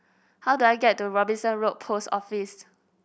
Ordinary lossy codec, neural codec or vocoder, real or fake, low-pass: none; none; real; none